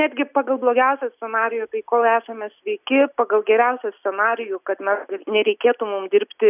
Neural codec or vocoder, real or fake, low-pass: none; real; 3.6 kHz